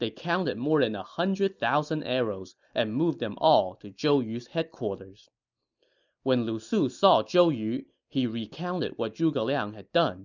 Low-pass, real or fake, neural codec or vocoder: 7.2 kHz; real; none